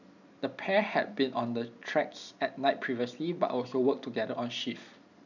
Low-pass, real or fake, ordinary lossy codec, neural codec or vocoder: 7.2 kHz; real; none; none